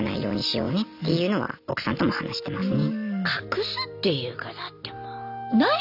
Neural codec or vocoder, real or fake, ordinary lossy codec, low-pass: none; real; none; 5.4 kHz